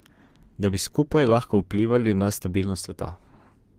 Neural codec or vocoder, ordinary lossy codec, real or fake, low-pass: codec, 32 kHz, 1.9 kbps, SNAC; Opus, 24 kbps; fake; 14.4 kHz